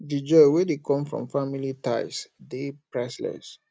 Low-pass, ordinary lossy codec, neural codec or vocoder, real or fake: none; none; none; real